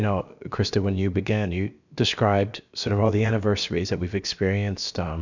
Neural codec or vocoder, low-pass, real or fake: codec, 16 kHz, about 1 kbps, DyCAST, with the encoder's durations; 7.2 kHz; fake